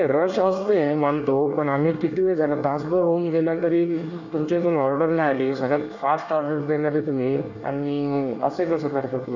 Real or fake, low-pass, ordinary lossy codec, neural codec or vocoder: fake; 7.2 kHz; none; codec, 24 kHz, 1 kbps, SNAC